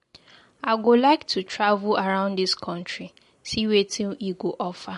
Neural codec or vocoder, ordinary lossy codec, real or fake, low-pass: none; MP3, 48 kbps; real; 14.4 kHz